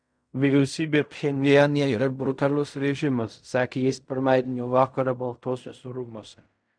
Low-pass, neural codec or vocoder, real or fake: 9.9 kHz; codec, 16 kHz in and 24 kHz out, 0.4 kbps, LongCat-Audio-Codec, fine tuned four codebook decoder; fake